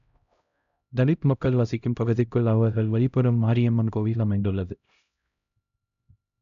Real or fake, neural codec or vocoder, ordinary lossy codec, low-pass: fake; codec, 16 kHz, 0.5 kbps, X-Codec, HuBERT features, trained on LibriSpeech; none; 7.2 kHz